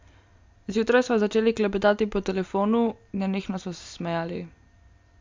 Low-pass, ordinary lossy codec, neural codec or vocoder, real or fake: 7.2 kHz; MP3, 64 kbps; none; real